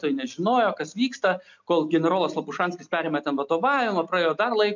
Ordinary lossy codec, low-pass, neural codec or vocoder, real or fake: MP3, 64 kbps; 7.2 kHz; none; real